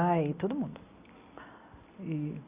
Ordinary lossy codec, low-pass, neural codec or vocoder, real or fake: Opus, 64 kbps; 3.6 kHz; none; real